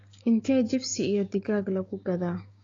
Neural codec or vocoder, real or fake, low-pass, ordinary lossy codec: codec, 16 kHz, 6 kbps, DAC; fake; 7.2 kHz; AAC, 32 kbps